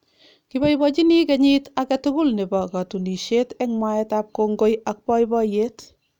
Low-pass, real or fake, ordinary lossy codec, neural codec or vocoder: 19.8 kHz; real; none; none